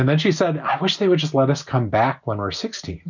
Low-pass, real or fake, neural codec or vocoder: 7.2 kHz; real; none